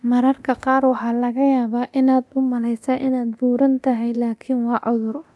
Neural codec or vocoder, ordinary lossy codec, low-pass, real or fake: codec, 24 kHz, 0.9 kbps, DualCodec; none; none; fake